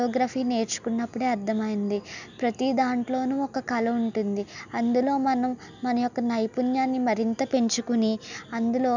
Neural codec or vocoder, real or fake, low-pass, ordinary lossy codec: none; real; 7.2 kHz; none